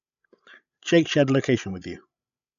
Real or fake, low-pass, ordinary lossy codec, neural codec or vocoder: fake; 7.2 kHz; none; codec, 16 kHz, 16 kbps, FreqCodec, larger model